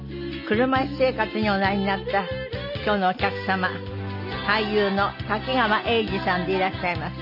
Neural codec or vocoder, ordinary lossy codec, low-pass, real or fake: none; none; 5.4 kHz; real